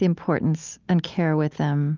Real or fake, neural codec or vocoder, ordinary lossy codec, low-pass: real; none; Opus, 24 kbps; 7.2 kHz